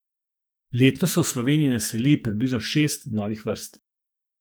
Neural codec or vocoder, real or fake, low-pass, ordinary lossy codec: codec, 44.1 kHz, 2.6 kbps, SNAC; fake; none; none